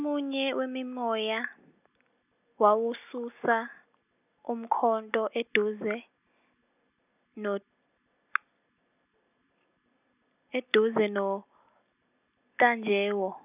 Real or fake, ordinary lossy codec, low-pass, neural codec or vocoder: real; none; 3.6 kHz; none